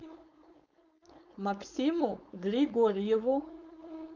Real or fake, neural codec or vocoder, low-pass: fake; codec, 16 kHz, 4.8 kbps, FACodec; 7.2 kHz